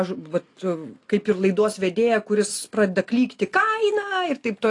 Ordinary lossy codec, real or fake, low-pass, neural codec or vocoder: AAC, 48 kbps; real; 10.8 kHz; none